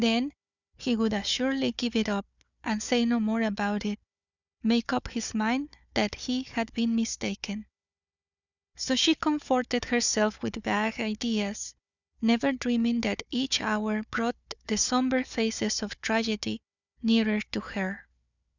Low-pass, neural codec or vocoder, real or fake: 7.2 kHz; codec, 16 kHz, 4 kbps, FunCodec, trained on Chinese and English, 50 frames a second; fake